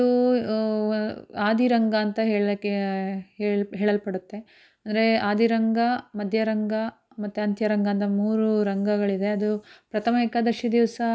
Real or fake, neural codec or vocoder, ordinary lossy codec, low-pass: real; none; none; none